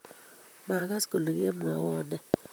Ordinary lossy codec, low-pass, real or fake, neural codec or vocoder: none; none; fake; vocoder, 44.1 kHz, 128 mel bands, Pupu-Vocoder